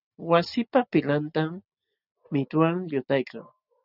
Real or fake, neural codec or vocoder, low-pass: real; none; 5.4 kHz